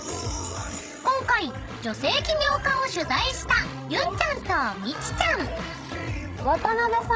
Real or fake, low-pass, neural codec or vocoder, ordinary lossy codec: fake; none; codec, 16 kHz, 16 kbps, FreqCodec, larger model; none